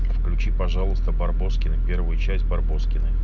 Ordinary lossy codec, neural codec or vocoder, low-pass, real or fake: none; none; 7.2 kHz; real